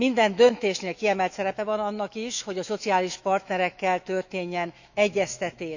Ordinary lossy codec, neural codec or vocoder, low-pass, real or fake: none; autoencoder, 48 kHz, 128 numbers a frame, DAC-VAE, trained on Japanese speech; 7.2 kHz; fake